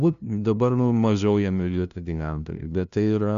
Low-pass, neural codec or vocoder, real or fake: 7.2 kHz; codec, 16 kHz, 0.5 kbps, FunCodec, trained on LibriTTS, 25 frames a second; fake